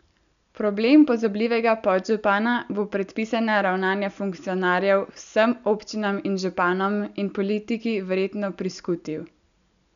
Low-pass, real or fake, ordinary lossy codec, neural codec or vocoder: 7.2 kHz; real; none; none